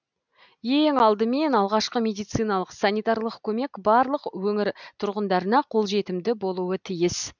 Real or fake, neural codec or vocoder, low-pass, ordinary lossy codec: real; none; 7.2 kHz; none